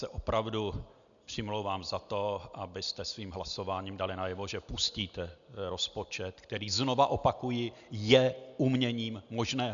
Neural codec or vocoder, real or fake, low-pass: none; real; 7.2 kHz